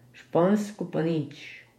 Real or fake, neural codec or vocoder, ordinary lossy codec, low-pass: fake; vocoder, 48 kHz, 128 mel bands, Vocos; MP3, 64 kbps; 19.8 kHz